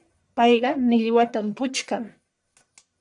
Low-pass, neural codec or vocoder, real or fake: 10.8 kHz; codec, 44.1 kHz, 1.7 kbps, Pupu-Codec; fake